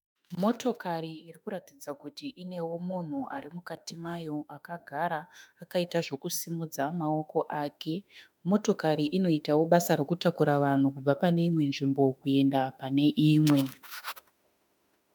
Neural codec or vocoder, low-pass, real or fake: autoencoder, 48 kHz, 32 numbers a frame, DAC-VAE, trained on Japanese speech; 19.8 kHz; fake